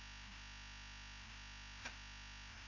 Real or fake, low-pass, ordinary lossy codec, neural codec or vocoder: fake; 7.2 kHz; none; codec, 16 kHz, 0.5 kbps, FreqCodec, larger model